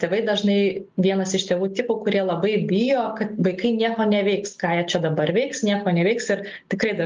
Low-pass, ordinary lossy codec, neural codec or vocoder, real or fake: 7.2 kHz; Opus, 24 kbps; none; real